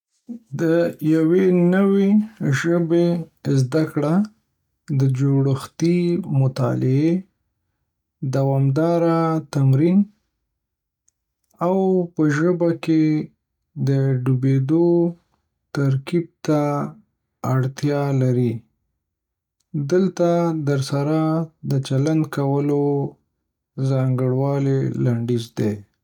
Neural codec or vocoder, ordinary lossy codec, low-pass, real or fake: none; none; 19.8 kHz; real